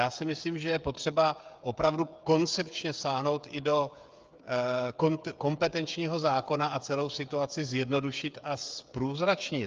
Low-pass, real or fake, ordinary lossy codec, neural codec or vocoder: 7.2 kHz; fake; Opus, 24 kbps; codec, 16 kHz, 8 kbps, FreqCodec, smaller model